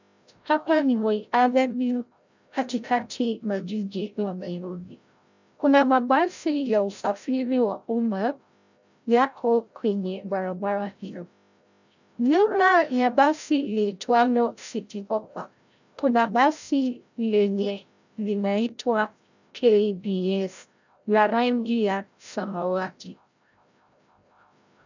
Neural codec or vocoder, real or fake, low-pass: codec, 16 kHz, 0.5 kbps, FreqCodec, larger model; fake; 7.2 kHz